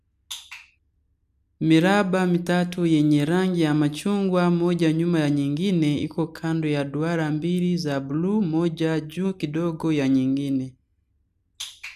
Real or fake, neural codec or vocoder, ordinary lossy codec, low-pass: real; none; none; 14.4 kHz